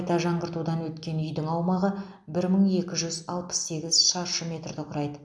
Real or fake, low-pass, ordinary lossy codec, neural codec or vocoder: real; none; none; none